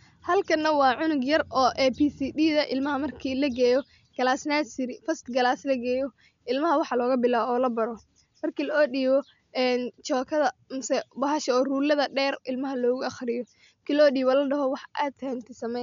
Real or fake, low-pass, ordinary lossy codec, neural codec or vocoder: real; 7.2 kHz; none; none